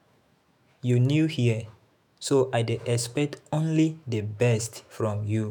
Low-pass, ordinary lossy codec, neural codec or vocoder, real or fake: 19.8 kHz; none; autoencoder, 48 kHz, 128 numbers a frame, DAC-VAE, trained on Japanese speech; fake